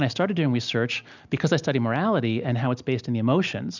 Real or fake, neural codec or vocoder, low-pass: real; none; 7.2 kHz